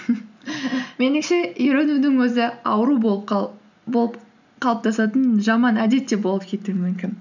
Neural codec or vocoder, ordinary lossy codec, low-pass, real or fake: none; none; 7.2 kHz; real